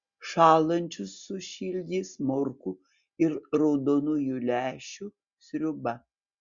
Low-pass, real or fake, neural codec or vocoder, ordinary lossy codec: 7.2 kHz; real; none; Opus, 64 kbps